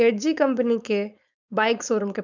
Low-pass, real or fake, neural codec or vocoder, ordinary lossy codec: 7.2 kHz; fake; codec, 16 kHz, 4.8 kbps, FACodec; none